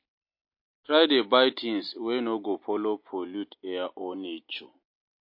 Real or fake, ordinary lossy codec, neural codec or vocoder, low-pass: real; MP3, 32 kbps; none; 5.4 kHz